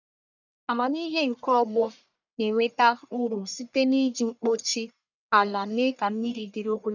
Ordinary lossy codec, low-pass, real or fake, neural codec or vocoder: none; 7.2 kHz; fake; codec, 44.1 kHz, 1.7 kbps, Pupu-Codec